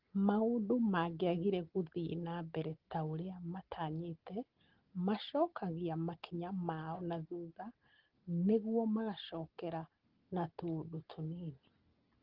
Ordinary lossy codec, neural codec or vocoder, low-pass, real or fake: Opus, 16 kbps; none; 5.4 kHz; real